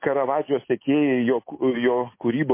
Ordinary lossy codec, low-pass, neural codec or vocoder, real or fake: MP3, 24 kbps; 3.6 kHz; none; real